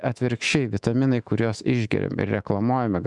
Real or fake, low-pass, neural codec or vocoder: fake; 10.8 kHz; autoencoder, 48 kHz, 128 numbers a frame, DAC-VAE, trained on Japanese speech